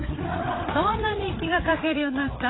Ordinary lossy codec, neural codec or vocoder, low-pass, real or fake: AAC, 16 kbps; codec, 16 kHz, 8 kbps, FreqCodec, larger model; 7.2 kHz; fake